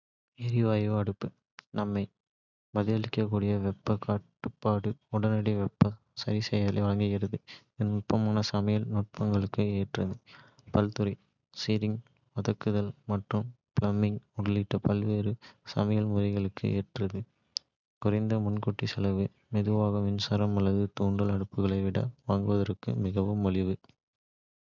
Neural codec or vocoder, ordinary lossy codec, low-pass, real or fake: none; none; 7.2 kHz; real